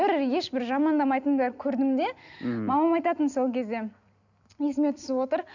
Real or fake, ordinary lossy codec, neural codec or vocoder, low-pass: real; none; none; 7.2 kHz